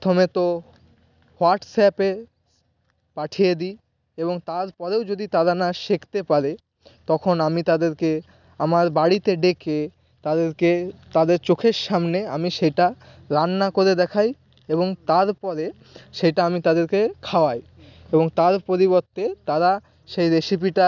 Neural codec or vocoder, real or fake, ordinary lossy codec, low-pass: none; real; none; 7.2 kHz